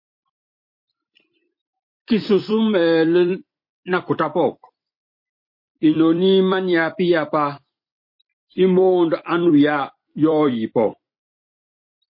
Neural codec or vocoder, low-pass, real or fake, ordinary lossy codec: vocoder, 44.1 kHz, 128 mel bands every 256 samples, BigVGAN v2; 5.4 kHz; fake; MP3, 32 kbps